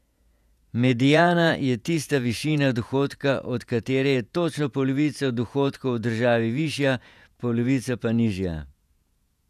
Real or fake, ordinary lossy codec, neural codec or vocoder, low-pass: fake; none; vocoder, 48 kHz, 128 mel bands, Vocos; 14.4 kHz